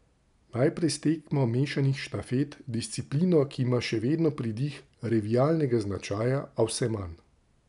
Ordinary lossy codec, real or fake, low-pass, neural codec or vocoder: none; real; 10.8 kHz; none